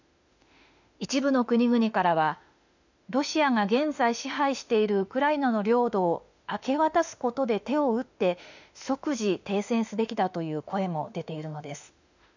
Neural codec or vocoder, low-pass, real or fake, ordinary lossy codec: autoencoder, 48 kHz, 32 numbers a frame, DAC-VAE, trained on Japanese speech; 7.2 kHz; fake; none